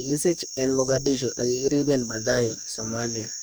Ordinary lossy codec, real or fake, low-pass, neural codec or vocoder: none; fake; none; codec, 44.1 kHz, 2.6 kbps, DAC